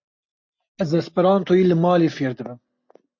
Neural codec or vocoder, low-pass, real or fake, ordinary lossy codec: none; 7.2 kHz; real; MP3, 48 kbps